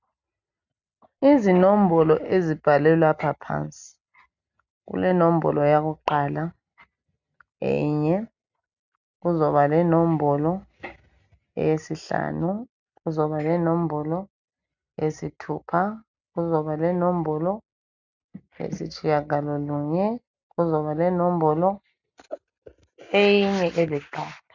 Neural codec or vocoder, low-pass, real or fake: none; 7.2 kHz; real